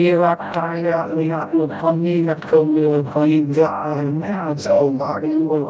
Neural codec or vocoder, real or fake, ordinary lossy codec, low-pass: codec, 16 kHz, 0.5 kbps, FreqCodec, smaller model; fake; none; none